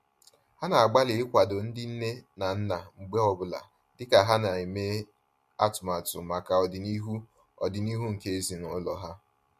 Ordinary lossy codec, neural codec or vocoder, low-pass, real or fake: MP3, 64 kbps; none; 14.4 kHz; real